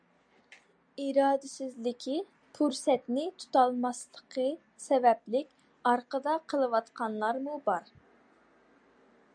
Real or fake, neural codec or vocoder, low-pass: real; none; 9.9 kHz